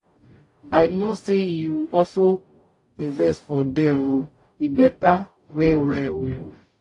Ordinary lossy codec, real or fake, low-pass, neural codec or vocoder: AAC, 48 kbps; fake; 10.8 kHz; codec, 44.1 kHz, 0.9 kbps, DAC